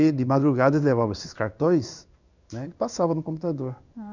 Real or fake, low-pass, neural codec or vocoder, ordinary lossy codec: fake; 7.2 kHz; codec, 16 kHz in and 24 kHz out, 1 kbps, XY-Tokenizer; none